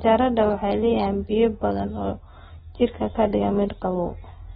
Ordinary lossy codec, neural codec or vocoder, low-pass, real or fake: AAC, 16 kbps; none; 19.8 kHz; real